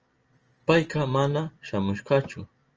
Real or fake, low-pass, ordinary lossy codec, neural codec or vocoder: real; 7.2 kHz; Opus, 24 kbps; none